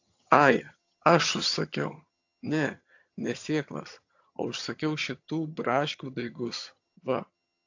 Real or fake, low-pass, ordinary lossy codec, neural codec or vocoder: fake; 7.2 kHz; AAC, 48 kbps; vocoder, 22.05 kHz, 80 mel bands, HiFi-GAN